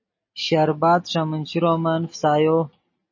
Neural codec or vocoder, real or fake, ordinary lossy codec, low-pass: none; real; MP3, 32 kbps; 7.2 kHz